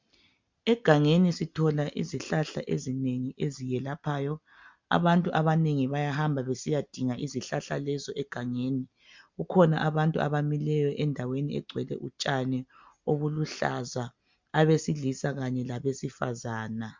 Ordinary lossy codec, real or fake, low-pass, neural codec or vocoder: MP3, 64 kbps; real; 7.2 kHz; none